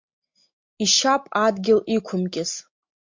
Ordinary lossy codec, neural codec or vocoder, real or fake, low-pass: MP3, 48 kbps; none; real; 7.2 kHz